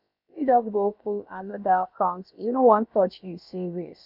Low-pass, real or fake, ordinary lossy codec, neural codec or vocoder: 5.4 kHz; fake; none; codec, 16 kHz, about 1 kbps, DyCAST, with the encoder's durations